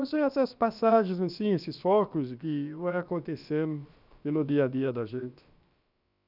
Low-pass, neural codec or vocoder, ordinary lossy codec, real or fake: 5.4 kHz; codec, 16 kHz, about 1 kbps, DyCAST, with the encoder's durations; none; fake